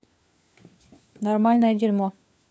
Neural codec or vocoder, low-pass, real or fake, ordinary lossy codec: codec, 16 kHz, 4 kbps, FunCodec, trained on LibriTTS, 50 frames a second; none; fake; none